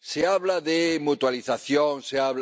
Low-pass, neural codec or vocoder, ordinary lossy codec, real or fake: none; none; none; real